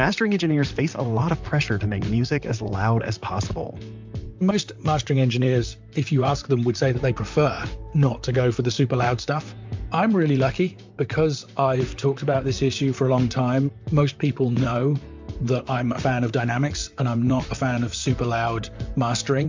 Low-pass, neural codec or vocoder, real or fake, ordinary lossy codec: 7.2 kHz; vocoder, 44.1 kHz, 128 mel bands, Pupu-Vocoder; fake; MP3, 64 kbps